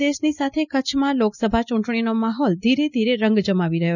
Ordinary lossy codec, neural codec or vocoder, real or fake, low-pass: none; none; real; 7.2 kHz